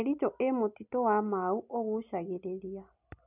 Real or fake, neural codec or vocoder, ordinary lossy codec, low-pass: real; none; none; 3.6 kHz